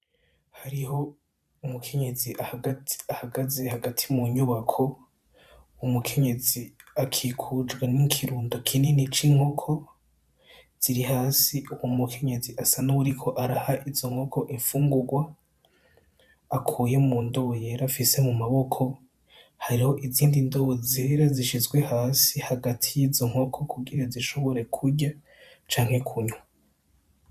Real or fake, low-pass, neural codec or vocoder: fake; 14.4 kHz; vocoder, 44.1 kHz, 128 mel bands every 512 samples, BigVGAN v2